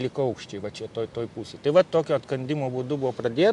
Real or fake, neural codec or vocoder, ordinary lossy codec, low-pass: real; none; MP3, 64 kbps; 10.8 kHz